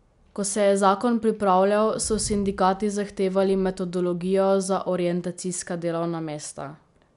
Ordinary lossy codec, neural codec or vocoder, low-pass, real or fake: none; none; 10.8 kHz; real